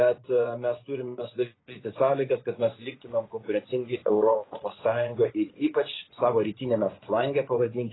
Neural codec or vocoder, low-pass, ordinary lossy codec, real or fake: vocoder, 24 kHz, 100 mel bands, Vocos; 7.2 kHz; AAC, 16 kbps; fake